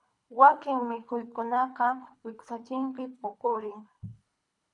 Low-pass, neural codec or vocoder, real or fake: 10.8 kHz; codec, 24 kHz, 3 kbps, HILCodec; fake